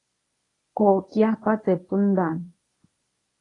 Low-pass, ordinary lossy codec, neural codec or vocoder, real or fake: 10.8 kHz; AAC, 32 kbps; codec, 24 kHz, 0.9 kbps, WavTokenizer, medium speech release version 1; fake